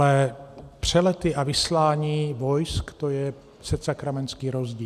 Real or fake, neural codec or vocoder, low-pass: real; none; 14.4 kHz